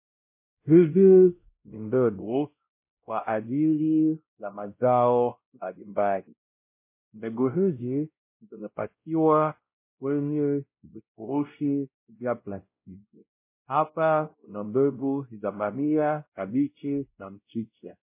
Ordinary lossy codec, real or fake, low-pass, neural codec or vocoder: MP3, 24 kbps; fake; 3.6 kHz; codec, 16 kHz, 0.5 kbps, X-Codec, WavLM features, trained on Multilingual LibriSpeech